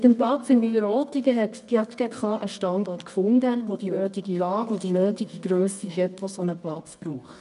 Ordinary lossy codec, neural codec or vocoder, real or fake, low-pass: none; codec, 24 kHz, 0.9 kbps, WavTokenizer, medium music audio release; fake; 10.8 kHz